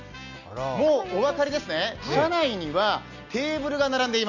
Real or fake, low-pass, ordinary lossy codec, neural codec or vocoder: real; 7.2 kHz; none; none